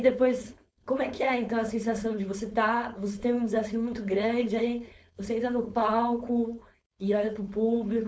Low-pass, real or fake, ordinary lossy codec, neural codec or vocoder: none; fake; none; codec, 16 kHz, 4.8 kbps, FACodec